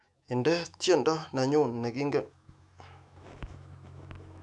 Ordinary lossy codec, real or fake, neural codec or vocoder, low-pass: none; fake; autoencoder, 48 kHz, 128 numbers a frame, DAC-VAE, trained on Japanese speech; 10.8 kHz